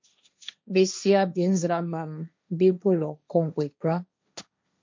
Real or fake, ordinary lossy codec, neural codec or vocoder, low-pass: fake; MP3, 48 kbps; codec, 16 kHz, 1.1 kbps, Voila-Tokenizer; 7.2 kHz